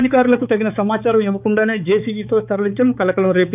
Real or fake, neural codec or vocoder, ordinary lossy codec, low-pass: fake; codec, 16 kHz, 4 kbps, X-Codec, HuBERT features, trained on balanced general audio; none; 3.6 kHz